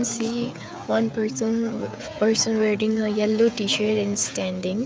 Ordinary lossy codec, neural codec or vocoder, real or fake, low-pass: none; codec, 16 kHz, 16 kbps, FreqCodec, smaller model; fake; none